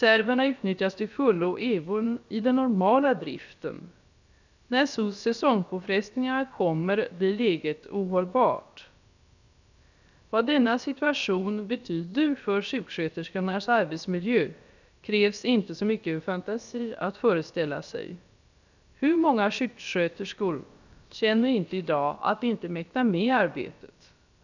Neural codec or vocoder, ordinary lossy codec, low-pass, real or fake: codec, 16 kHz, about 1 kbps, DyCAST, with the encoder's durations; none; 7.2 kHz; fake